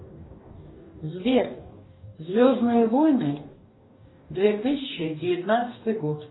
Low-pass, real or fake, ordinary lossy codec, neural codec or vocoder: 7.2 kHz; fake; AAC, 16 kbps; codec, 44.1 kHz, 2.6 kbps, DAC